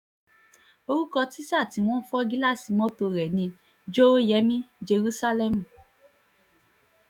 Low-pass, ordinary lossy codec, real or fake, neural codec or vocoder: 19.8 kHz; none; fake; autoencoder, 48 kHz, 128 numbers a frame, DAC-VAE, trained on Japanese speech